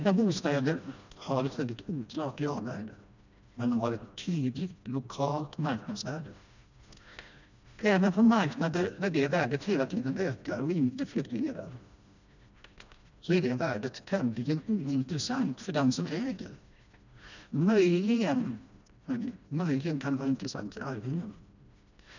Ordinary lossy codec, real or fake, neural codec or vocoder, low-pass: none; fake; codec, 16 kHz, 1 kbps, FreqCodec, smaller model; 7.2 kHz